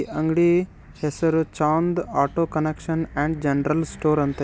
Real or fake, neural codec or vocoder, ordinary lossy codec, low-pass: real; none; none; none